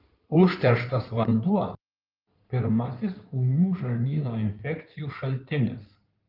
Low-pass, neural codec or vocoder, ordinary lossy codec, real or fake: 5.4 kHz; codec, 16 kHz in and 24 kHz out, 2.2 kbps, FireRedTTS-2 codec; Opus, 24 kbps; fake